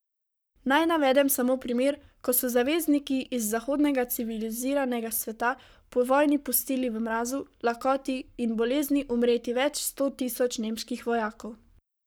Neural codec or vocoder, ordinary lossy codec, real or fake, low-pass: codec, 44.1 kHz, 7.8 kbps, Pupu-Codec; none; fake; none